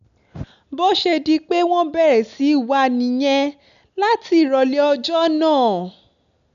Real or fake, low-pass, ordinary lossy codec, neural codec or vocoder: real; 7.2 kHz; none; none